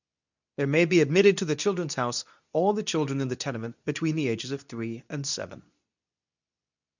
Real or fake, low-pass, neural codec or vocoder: fake; 7.2 kHz; codec, 24 kHz, 0.9 kbps, WavTokenizer, medium speech release version 2